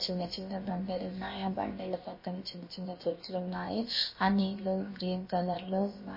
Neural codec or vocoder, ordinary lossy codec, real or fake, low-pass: codec, 16 kHz, about 1 kbps, DyCAST, with the encoder's durations; MP3, 24 kbps; fake; 5.4 kHz